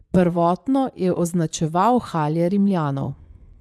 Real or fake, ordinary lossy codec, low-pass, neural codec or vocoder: fake; none; none; vocoder, 24 kHz, 100 mel bands, Vocos